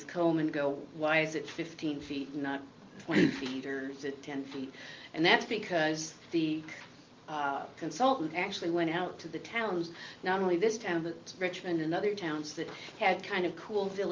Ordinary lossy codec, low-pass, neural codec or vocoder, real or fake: Opus, 24 kbps; 7.2 kHz; none; real